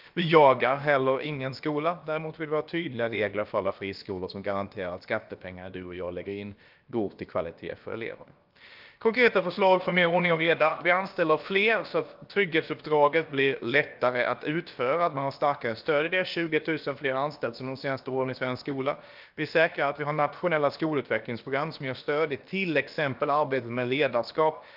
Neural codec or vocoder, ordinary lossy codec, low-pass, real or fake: codec, 16 kHz, about 1 kbps, DyCAST, with the encoder's durations; Opus, 24 kbps; 5.4 kHz; fake